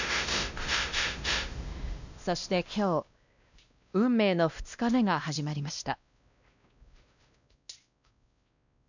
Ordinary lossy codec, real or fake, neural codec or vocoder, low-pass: none; fake; codec, 16 kHz, 1 kbps, X-Codec, WavLM features, trained on Multilingual LibriSpeech; 7.2 kHz